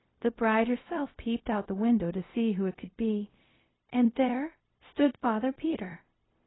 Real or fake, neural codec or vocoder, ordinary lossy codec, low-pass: fake; codec, 16 kHz, 0.4 kbps, LongCat-Audio-Codec; AAC, 16 kbps; 7.2 kHz